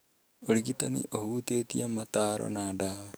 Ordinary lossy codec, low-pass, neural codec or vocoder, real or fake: none; none; codec, 44.1 kHz, 7.8 kbps, DAC; fake